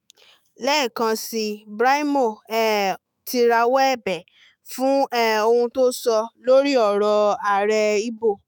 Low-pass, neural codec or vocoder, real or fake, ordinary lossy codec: none; autoencoder, 48 kHz, 128 numbers a frame, DAC-VAE, trained on Japanese speech; fake; none